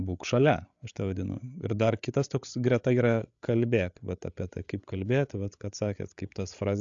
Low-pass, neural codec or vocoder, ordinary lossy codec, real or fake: 7.2 kHz; codec, 16 kHz, 8 kbps, FreqCodec, larger model; AAC, 64 kbps; fake